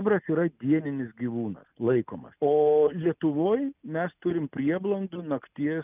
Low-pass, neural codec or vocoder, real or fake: 3.6 kHz; none; real